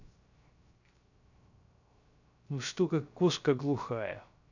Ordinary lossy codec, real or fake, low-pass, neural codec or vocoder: none; fake; 7.2 kHz; codec, 16 kHz, 0.3 kbps, FocalCodec